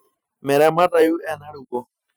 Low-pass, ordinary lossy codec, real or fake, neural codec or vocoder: none; none; fake; vocoder, 44.1 kHz, 128 mel bands every 512 samples, BigVGAN v2